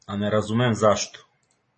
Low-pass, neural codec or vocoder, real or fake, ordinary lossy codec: 10.8 kHz; none; real; MP3, 32 kbps